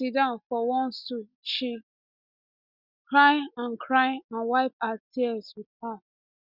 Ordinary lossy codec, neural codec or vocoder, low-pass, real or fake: Opus, 64 kbps; none; 5.4 kHz; real